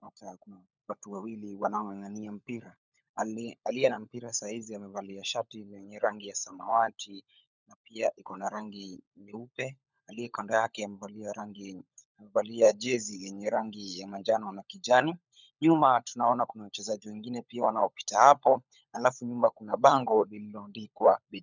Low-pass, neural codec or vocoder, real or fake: 7.2 kHz; codec, 16 kHz, 16 kbps, FunCodec, trained on LibriTTS, 50 frames a second; fake